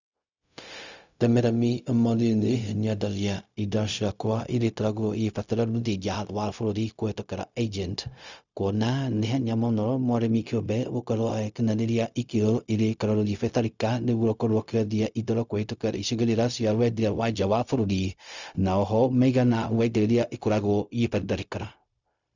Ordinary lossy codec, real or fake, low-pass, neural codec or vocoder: none; fake; 7.2 kHz; codec, 16 kHz, 0.4 kbps, LongCat-Audio-Codec